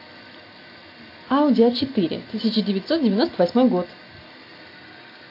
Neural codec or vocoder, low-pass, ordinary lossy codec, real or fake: none; 5.4 kHz; AAC, 32 kbps; real